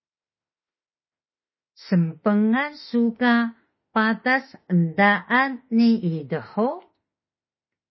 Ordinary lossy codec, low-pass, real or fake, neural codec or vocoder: MP3, 24 kbps; 7.2 kHz; fake; autoencoder, 48 kHz, 32 numbers a frame, DAC-VAE, trained on Japanese speech